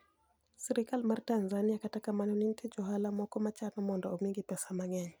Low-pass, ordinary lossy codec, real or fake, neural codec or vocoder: none; none; real; none